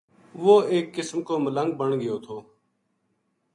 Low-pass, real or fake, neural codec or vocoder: 10.8 kHz; real; none